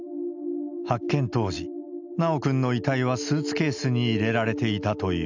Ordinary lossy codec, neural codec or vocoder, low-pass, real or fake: none; none; 7.2 kHz; real